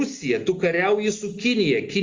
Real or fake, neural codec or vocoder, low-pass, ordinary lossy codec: real; none; 7.2 kHz; Opus, 32 kbps